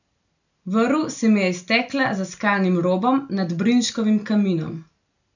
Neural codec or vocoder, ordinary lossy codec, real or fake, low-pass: none; none; real; 7.2 kHz